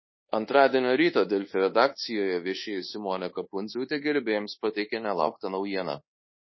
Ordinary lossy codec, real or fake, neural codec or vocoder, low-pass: MP3, 24 kbps; fake; codec, 24 kHz, 1.2 kbps, DualCodec; 7.2 kHz